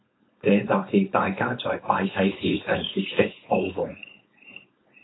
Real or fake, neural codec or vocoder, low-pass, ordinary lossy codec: fake; codec, 16 kHz, 4.8 kbps, FACodec; 7.2 kHz; AAC, 16 kbps